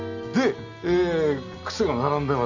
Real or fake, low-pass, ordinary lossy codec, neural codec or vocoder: real; 7.2 kHz; none; none